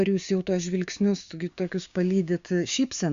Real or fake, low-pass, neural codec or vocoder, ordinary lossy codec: fake; 7.2 kHz; codec, 16 kHz, 6 kbps, DAC; Opus, 64 kbps